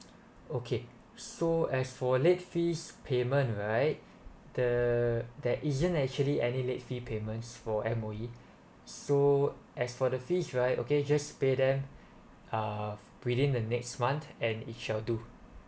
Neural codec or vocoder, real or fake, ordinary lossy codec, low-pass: none; real; none; none